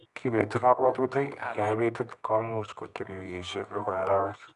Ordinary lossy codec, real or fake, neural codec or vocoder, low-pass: none; fake; codec, 24 kHz, 0.9 kbps, WavTokenizer, medium music audio release; 10.8 kHz